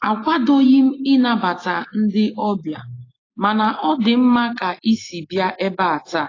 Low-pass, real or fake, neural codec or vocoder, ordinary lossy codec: 7.2 kHz; real; none; AAC, 32 kbps